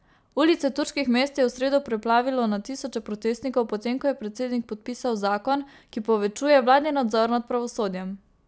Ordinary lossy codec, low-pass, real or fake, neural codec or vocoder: none; none; real; none